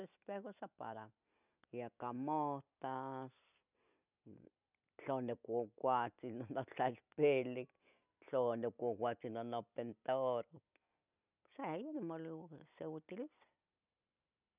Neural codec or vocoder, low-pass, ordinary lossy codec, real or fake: none; 3.6 kHz; none; real